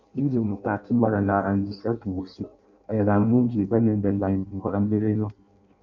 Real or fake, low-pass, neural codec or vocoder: fake; 7.2 kHz; codec, 16 kHz in and 24 kHz out, 0.6 kbps, FireRedTTS-2 codec